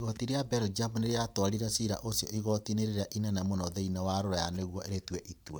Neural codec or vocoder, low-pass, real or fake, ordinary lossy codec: vocoder, 44.1 kHz, 128 mel bands every 512 samples, BigVGAN v2; none; fake; none